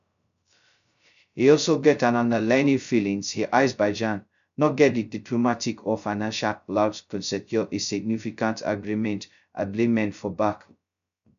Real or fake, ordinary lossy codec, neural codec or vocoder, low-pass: fake; none; codec, 16 kHz, 0.2 kbps, FocalCodec; 7.2 kHz